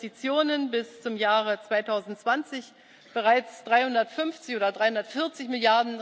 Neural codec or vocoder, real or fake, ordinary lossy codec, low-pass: none; real; none; none